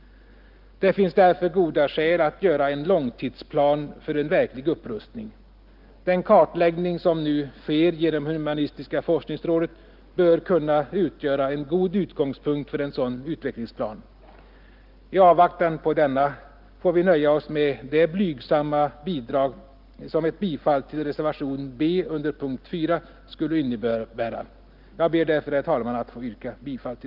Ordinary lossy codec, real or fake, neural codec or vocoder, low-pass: Opus, 24 kbps; real; none; 5.4 kHz